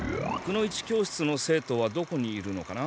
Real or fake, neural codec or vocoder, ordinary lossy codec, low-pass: real; none; none; none